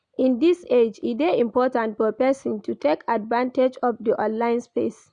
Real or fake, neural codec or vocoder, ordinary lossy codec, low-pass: real; none; none; 9.9 kHz